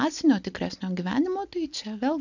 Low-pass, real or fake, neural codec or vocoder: 7.2 kHz; real; none